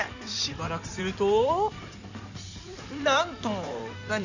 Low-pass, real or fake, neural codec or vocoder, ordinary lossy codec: 7.2 kHz; fake; codec, 16 kHz in and 24 kHz out, 2.2 kbps, FireRedTTS-2 codec; none